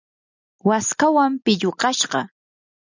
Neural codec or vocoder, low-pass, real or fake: none; 7.2 kHz; real